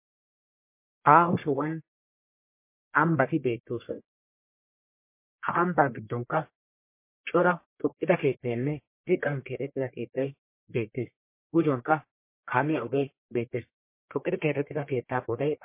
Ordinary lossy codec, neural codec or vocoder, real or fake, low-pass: MP3, 24 kbps; codec, 44.1 kHz, 1.7 kbps, Pupu-Codec; fake; 3.6 kHz